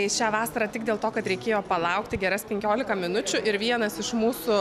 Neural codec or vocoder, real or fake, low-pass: none; real; 14.4 kHz